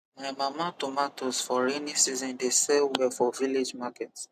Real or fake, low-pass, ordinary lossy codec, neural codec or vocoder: real; 14.4 kHz; none; none